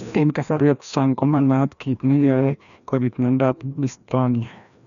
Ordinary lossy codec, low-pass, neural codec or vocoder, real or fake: none; 7.2 kHz; codec, 16 kHz, 1 kbps, FreqCodec, larger model; fake